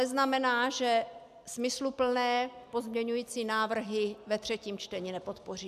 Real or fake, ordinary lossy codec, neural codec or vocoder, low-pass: real; AAC, 96 kbps; none; 14.4 kHz